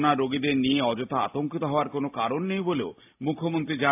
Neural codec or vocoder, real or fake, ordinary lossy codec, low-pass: none; real; AAC, 32 kbps; 3.6 kHz